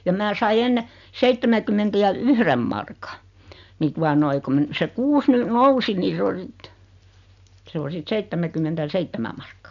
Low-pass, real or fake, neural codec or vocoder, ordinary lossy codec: 7.2 kHz; real; none; none